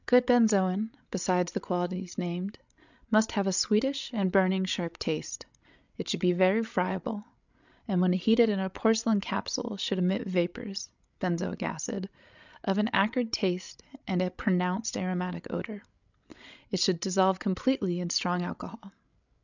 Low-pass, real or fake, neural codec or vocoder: 7.2 kHz; fake; codec, 16 kHz, 16 kbps, FreqCodec, larger model